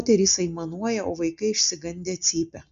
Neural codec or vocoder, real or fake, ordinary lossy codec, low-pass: none; real; AAC, 64 kbps; 7.2 kHz